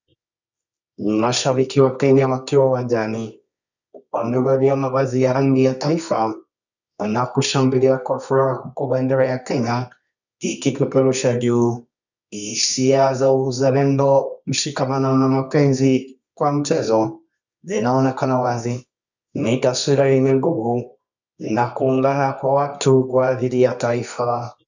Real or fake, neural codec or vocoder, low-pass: fake; codec, 24 kHz, 0.9 kbps, WavTokenizer, medium music audio release; 7.2 kHz